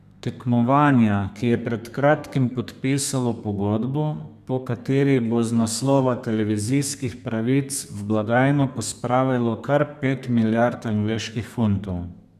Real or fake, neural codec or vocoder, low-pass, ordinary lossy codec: fake; codec, 32 kHz, 1.9 kbps, SNAC; 14.4 kHz; none